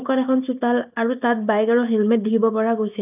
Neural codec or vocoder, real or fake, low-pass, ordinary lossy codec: none; real; 3.6 kHz; none